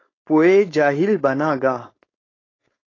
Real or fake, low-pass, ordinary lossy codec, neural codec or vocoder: fake; 7.2 kHz; AAC, 32 kbps; codec, 16 kHz, 4.8 kbps, FACodec